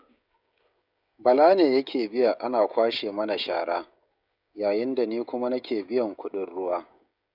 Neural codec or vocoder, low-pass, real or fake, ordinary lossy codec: codec, 16 kHz, 16 kbps, FreqCodec, smaller model; 5.4 kHz; fake; none